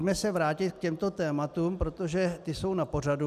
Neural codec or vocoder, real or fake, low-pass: none; real; 14.4 kHz